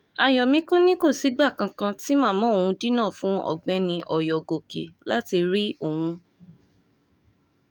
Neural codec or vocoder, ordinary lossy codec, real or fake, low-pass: codec, 44.1 kHz, 7.8 kbps, DAC; none; fake; 19.8 kHz